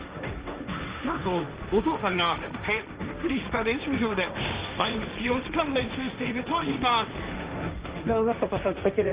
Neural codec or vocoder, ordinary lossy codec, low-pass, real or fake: codec, 16 kHz, 1.1 kbps, Voila-Tokenizer; Opus, 32 kbps; 3.6 kHz; fake